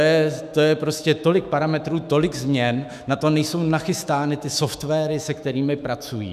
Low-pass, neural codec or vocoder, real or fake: 14.4 kHz; autoencoder, 48 kHz, 128 numbers a frame, DAC-VAE, trained on Japanese speech; fake